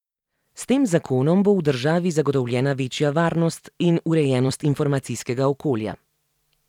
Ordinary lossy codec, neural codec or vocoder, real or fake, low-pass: none; vocoder, 44.1 kHz, 128 mel bands every 256 samples, BigVGAN v2; fake; 19.8 kHz